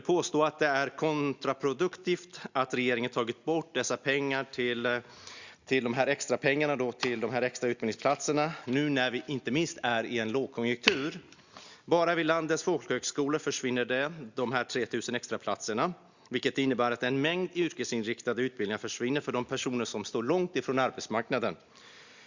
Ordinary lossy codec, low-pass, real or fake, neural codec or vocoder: Opus, 64 kbps; 7.2 kHz; real; none